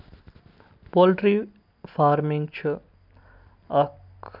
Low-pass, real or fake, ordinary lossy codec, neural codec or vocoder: 5.4 kHz; real; none; none